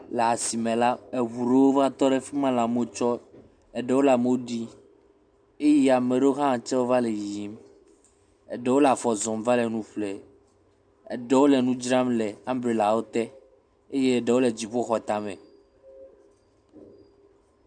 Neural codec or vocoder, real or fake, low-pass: none; real; 9.9 kHz